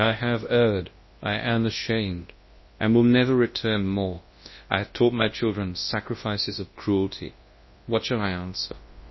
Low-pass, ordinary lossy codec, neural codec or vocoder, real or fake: 7.2 kHz; MP3, 24 kbps; codec, 24 kHz, 0.9 kbps, WavTokenizer, large speech release; fake